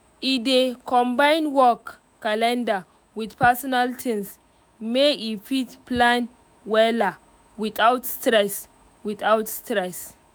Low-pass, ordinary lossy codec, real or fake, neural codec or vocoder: none; none; fake; autoencoder, 48 kHz, 128 numbers a frame, DAC-VAE, trained on Japanese speech